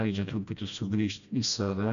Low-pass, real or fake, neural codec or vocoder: 7.2 kHz; fake; codec, 16 kHz, 1 kbps, FreqCodec, smaller model